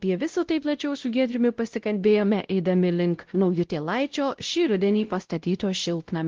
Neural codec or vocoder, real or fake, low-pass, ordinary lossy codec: codec, 16 kHz, 0.5 kbps, X-Codec, WavLM features, trained on Multilingual LibriSpeech; fake; 7.2 kHz; Opus, 16 kbps